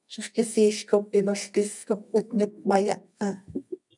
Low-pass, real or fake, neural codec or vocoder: 10.8 kHz; fake; codec, 24 kHz, 0.9 kbps, WavTokenizer, medium music audio release